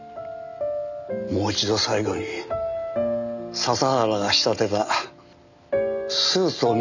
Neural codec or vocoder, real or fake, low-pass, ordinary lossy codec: none; real; 7.2 kHz; none